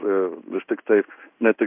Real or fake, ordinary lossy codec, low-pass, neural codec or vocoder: fake; AAC, 32 kbps; 3.6 kHz; codec, 16 kHz in and 24 kHz out, 1 kbps, XY-Tokenizer